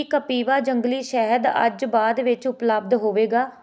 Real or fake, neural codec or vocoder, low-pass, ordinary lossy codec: real; none; none; none